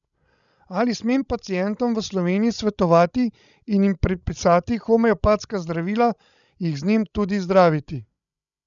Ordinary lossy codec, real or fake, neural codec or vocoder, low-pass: none; fake; codec, 16 kHz, 16 kbps, FreqCodec, larger model; 7.2 kHz